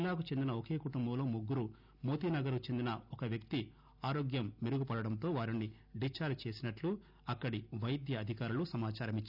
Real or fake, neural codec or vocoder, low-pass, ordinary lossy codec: real; none; 5.4 kHz; none